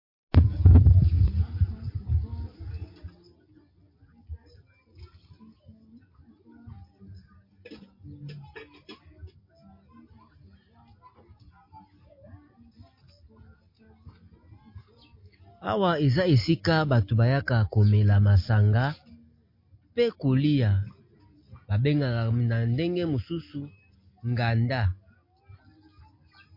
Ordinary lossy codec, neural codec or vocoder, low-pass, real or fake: MP3, 32 kbps; none; 5.4 kHz; real